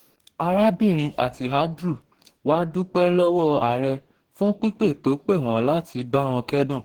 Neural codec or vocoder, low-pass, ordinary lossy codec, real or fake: codec, 44.1 kHz, 2.6 kbps, DAC; 19.8 kHz; Opus, 16 kbps; fake